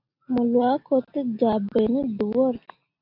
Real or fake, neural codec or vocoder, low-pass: real; none; 5.4 kHz